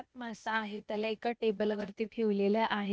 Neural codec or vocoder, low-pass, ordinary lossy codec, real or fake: codec, 16 kHz, 0.8 kbps, ZipCodec; none; none; fake